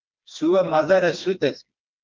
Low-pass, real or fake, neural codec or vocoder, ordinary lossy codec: 7.2 kHz; fake; codec, 16 kHz, 2 kbps, FreqCodec, smaller model; Opus, 24 kbps